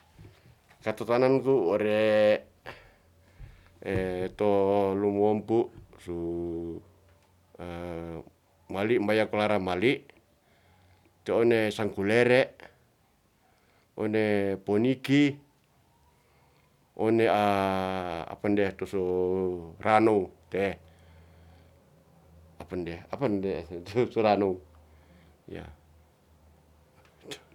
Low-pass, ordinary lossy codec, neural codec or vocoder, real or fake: 19.8 kHz; none; none; real